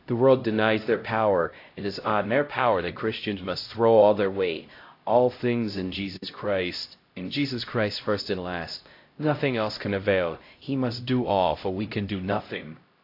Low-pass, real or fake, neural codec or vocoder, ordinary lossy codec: 5.4 kHz; fake; codec, 16 kHz, 0.5 kbps, X-Codec, HuBERT features, trained on LibriSpeech; AAC, 32 kbps